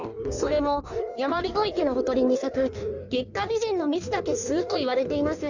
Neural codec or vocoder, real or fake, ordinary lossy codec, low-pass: codec, 16 kHz in and 24 kHz out, 1.1 kbps, FireRedTTS-2 codec; fake; none; 7.2 kHz